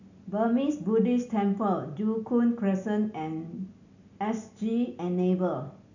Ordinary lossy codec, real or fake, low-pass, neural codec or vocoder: none; real; 7.2 kHz; none